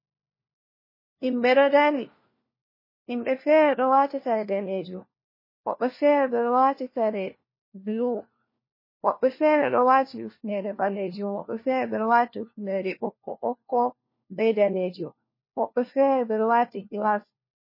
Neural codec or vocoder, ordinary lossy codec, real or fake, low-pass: codec, 16 kHz, 1 kbps, FunCodec, trained on LibriTTS, 50 frames a second; MP3, 24 kbps; fake; 5.4 kHz